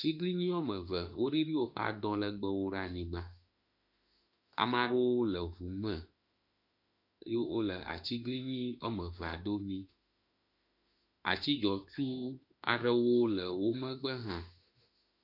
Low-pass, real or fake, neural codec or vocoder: 5.4 kHz; fake; autoencoder, 48 kHz, 32 numbers a frame, DAC-VAE, trained on Japanese speech